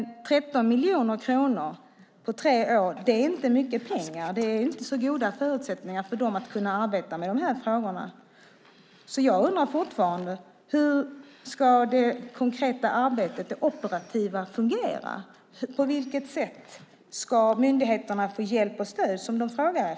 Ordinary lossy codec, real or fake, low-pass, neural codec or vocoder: none; real; none; none